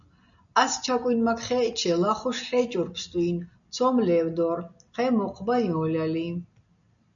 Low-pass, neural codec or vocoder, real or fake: 7.2 kHz; none; real